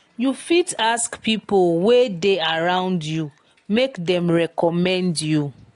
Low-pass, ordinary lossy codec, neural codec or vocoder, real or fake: 10.8 kHz; AAC, 48 kbps; none; real